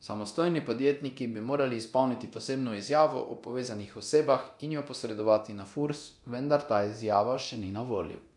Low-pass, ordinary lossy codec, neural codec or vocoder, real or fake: 10.8 kHz; none; codec, 24 kHz, 0.9 kbps, DualCodec; fake